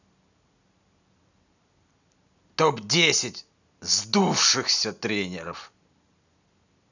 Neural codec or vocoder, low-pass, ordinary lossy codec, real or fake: none; 7.2 kHz; none; real